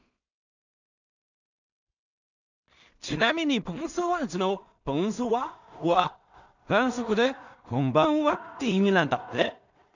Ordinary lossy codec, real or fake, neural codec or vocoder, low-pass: none; fake; codec, 16 kHz in and 24 kHz out, 0.4 kbps, LongCat-Audio-Codec, two codebook decoder; 7.2 kHz